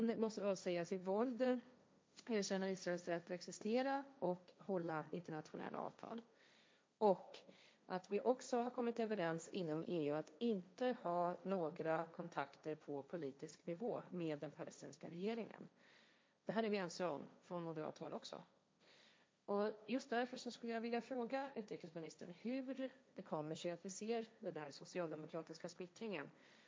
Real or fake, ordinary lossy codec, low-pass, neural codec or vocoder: fake; none; none; codec, 16 kHz, 1.1 kbps, Voila-Tokenizer